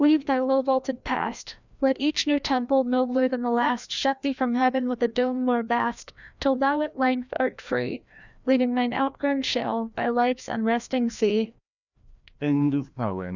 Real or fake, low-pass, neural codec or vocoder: fake; 7.2 kHz; codec, 16 kHz, 1 kbps, FreqCodec, larger model